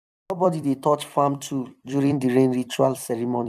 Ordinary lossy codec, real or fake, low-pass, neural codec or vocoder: none; fake; 14.4 kHz; vocoder, 44.1 kHz, 128 mel bands every 256 samples, BigVGAN v2